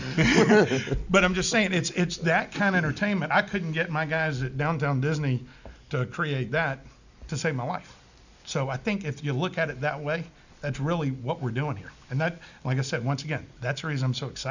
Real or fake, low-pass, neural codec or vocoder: real; 7.2 kHz; none